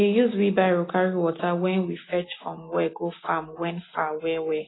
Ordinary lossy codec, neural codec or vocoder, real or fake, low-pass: AAC, 16 kbps; none; real; 7.2 kHz